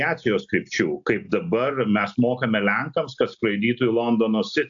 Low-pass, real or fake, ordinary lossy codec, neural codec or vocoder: 7.2 kHz; real; AAC, 64 kbps; none